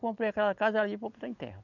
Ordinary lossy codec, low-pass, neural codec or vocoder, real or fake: none; 7.2 kHz; none; real